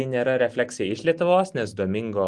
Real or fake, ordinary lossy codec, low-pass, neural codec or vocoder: real; Opus, 24 kbps; 10.8 kHz; none